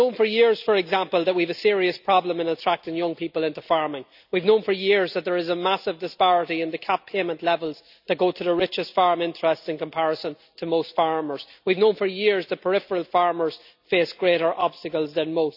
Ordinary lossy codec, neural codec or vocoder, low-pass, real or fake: none; none; 5.4 kHz; real